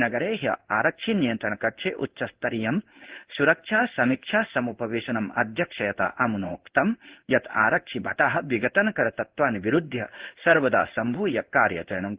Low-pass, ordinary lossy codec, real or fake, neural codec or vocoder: 3.6 kHz; Opus, 16 kbps; fake; codec, 16 kHz in and 24 kHz out, 1 kbps, XY-Tokenizer